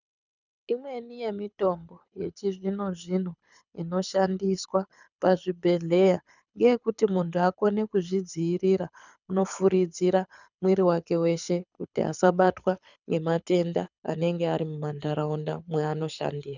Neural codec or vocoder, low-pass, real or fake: codec, 24 kHz, 6 kbps, HILCodec; 7.2 kHz; fake